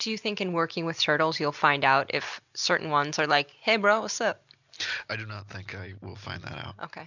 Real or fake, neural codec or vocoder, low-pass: real; none; 7.2 kHz